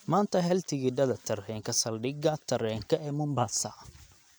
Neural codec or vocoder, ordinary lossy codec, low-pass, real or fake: none; none; none; real